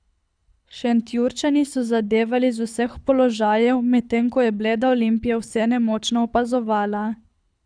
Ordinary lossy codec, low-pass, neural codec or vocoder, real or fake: none; 9.9 kHz; codec, 24 kHz, 6 kbps, HILCodec; fake